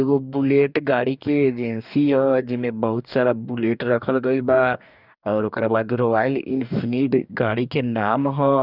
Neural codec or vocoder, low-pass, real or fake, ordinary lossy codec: codec, 44.1 kHz, 2.6 kbps, DAC; 5.4 kHz; fake; none